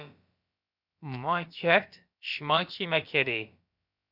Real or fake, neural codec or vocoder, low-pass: fake; codec, 16 kHz, about 1 kbps, DyCAST, with the encoder's durations; 5.4 kHz